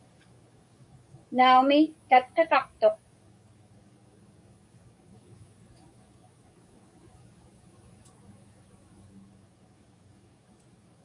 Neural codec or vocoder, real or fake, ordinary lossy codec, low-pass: codec, 44.1 kHz, 7.8 kbps, DAC; fake; MP3, 64 kbps; 10.8 kHz